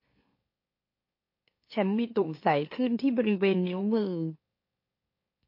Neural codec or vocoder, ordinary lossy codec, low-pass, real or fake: autoencoder, 44.1 kHz, a latent of 192 numbers a frame, MeloTTS; MP3, 32 kbps; 5.4 kHz; fake